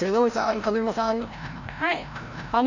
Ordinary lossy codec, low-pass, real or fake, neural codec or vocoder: none; 7.2 kHz; fake; codec, 16 kHz, 0.5 kbps, FreqCodec, larger model